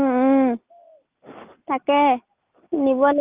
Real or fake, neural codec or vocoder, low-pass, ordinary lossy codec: real; none; 3.6 kHz; Opus, 24 kbps